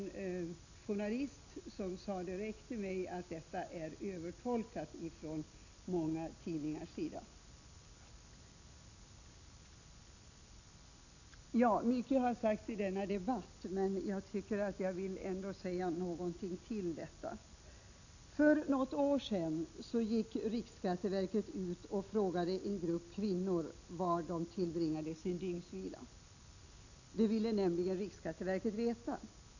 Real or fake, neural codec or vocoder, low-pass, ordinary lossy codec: real; none; 7.2 kHz; none